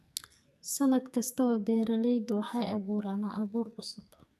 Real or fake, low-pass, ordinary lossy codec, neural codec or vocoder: fake; 14.4 kHz; none; codec, 44.1 kHz, 2.6 kbps, SNAC